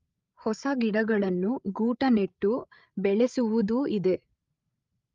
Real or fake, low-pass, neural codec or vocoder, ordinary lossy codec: fake; 7.2 kHz; codec, 16 kHz, 8 kbps, FreqCodec, larger model; Opus, 32 kbps